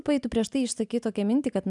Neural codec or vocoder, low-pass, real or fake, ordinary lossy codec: none; 10.8 kHz; real; MP3, 96 kbps